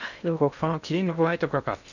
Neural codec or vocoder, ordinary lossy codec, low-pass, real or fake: codec, 16 kHz in and 24 kHz out, 0.6 kbps, FocalCodec, streaming, 2048 codes; none; 7.2 kHz; fake